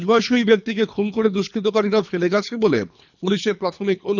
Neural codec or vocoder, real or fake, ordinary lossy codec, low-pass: codec, 24 kHz, 3 kbps, HILCodec; fake; none; 7.2 kHz